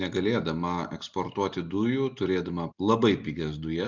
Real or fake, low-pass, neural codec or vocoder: real; 7.2 kHz; none